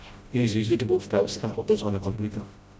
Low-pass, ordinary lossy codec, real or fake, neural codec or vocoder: none; none; fake; codec, 16 kHz, 0.5 kbps, FreqCodec, smaller model